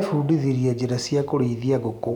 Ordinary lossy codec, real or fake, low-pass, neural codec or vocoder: none; real; 19.8 kHz; none